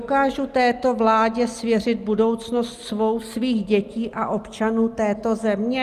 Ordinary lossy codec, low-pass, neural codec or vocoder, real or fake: Opus, 32 kbps; 14.4 kHz; none; real